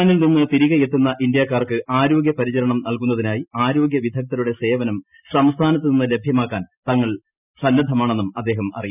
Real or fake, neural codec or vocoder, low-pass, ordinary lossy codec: real; none; 3.6 kHz; none